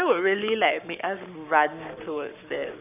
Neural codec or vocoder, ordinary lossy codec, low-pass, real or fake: codec, 44.1 kHz, 7.8 kbps, Pupu-Codec; none; 3.6 kHz; fake